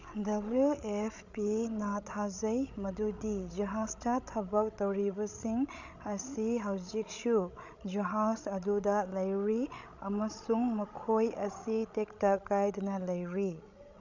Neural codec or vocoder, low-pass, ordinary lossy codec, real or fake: codec, 16 kHz, 16 kbps, FreqCodec, larger model; 7.2 kHz; none; fake